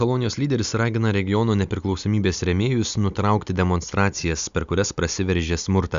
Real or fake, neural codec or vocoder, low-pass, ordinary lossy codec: real; none; 7.2 kHz; Opus, 64 kbps